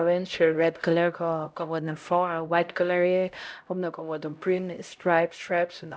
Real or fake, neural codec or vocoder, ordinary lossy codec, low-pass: fake; codec, 16 kHz, 0.5 kbps, X-Codec, HuBERT features, trained on LibriSpeech; none; none